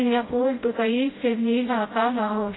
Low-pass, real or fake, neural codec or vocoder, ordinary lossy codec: 7.2 kHz; fake; codec, 16 kHz, 0.5 kbps, FreqCodec, smaller model; AAC, 16 kbps